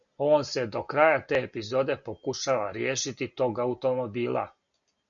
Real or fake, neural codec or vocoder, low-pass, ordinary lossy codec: real; none; 7.2 kHz; MP3, 96 kbps